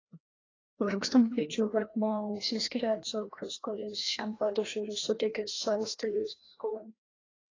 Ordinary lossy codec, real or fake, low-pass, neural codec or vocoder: AAC, 32 kbps; fake; 7.2 kHz; codec, 16 kHz, 1 kbps, FreqCodec, larger model